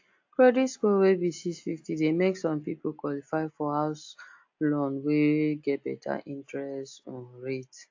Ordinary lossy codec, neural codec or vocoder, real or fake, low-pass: AAC, 48 kbps; none; real; 7.2 kHz